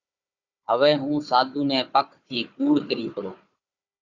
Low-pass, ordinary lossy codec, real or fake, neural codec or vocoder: 7.2 kHz; Opus, 64 kbps; fake; codec, 16 kHz, 4 kbps, FunCodec, trained on Chinese and English, 50 frames a second